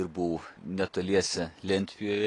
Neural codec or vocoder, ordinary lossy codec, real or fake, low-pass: none; AAC, 32 kbps; real; 10.8 kHz